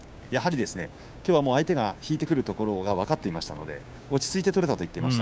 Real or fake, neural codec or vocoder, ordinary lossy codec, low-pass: fake; codec, 16 kHz, 6 kbps, DAC; none; none